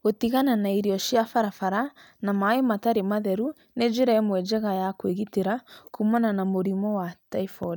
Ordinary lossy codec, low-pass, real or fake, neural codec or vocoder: none; none; real; none